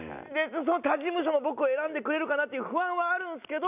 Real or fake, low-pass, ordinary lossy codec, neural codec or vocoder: real; 3.6 kHz; none; none